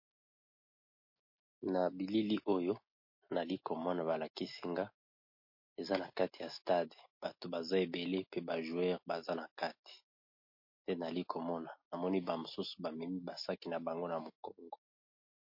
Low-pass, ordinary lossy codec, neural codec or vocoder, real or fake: 5.4 kHz; MP3, 32 kbps; none; real